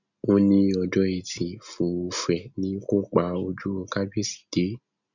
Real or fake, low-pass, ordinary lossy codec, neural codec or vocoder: real; 7.2 kHz; none; none